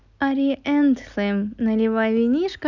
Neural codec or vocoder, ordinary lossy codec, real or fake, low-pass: none; none; real; 7.2 kHz